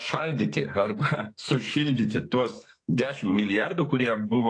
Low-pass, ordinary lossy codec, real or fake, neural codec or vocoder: 9.9 kHz; AAC, 48 kbps; fake; codec, 32 kHz, 1.9 kbps, SNAC